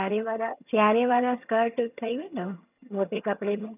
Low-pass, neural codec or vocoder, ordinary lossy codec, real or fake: 3.6 kHz; vocoder, 22.05 kHz, 80 mel bands, HiFi-GAN; none; fake